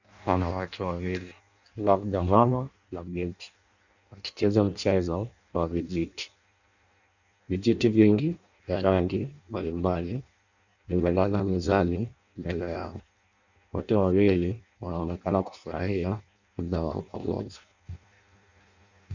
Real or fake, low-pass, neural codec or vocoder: fake; 7.2 kHz; codec, 16 kHz in and 24 kHz out, 0.6 kbps, FireRedTTS-2 codec